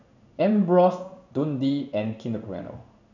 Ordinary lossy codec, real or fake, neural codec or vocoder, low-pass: none; fake; codec, 16 kHz in and 24 kHz out, 1 kbps, XY-Tokenizer; 7.2 kHz